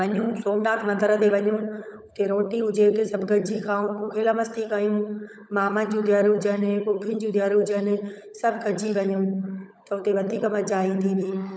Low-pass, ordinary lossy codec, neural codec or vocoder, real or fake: none; none; codec, 16 kHz, 16 kbps, FunCodec, trained on LibriTTS, 50 frames a second; fake